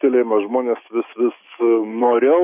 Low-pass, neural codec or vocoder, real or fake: 3.6 kHz; autoencoder, 48 kHz, 128 numbers a frame, DAC-VAE, trained on Japanese speech; fake